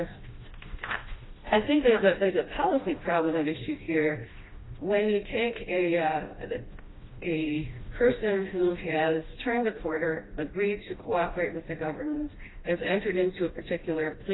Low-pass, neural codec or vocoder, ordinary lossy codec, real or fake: 7.2 kHz; codec, 16 kHz, 1 kbps, FreqCodec, smaller model; AAC, 16 kbps; fake